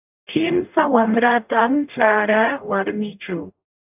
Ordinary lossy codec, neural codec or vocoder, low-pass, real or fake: AAC, 32 kbps; codec, 44.1 kHz, 0.9 kbps, DAC; 3.6 kHz; fake